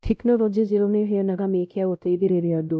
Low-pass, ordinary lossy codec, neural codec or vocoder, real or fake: none; none; codec, 16 kHz, 0.5 kbps, X-Codec, WavLM features, trained on Multilingual LibriSpeech; fake